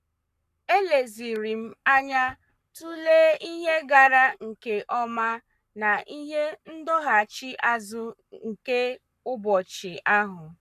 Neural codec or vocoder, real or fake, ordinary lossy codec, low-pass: codec, 44.1 kHz, 7.8 kbps, Pupu-Codec; fake; none; 14.4 kHz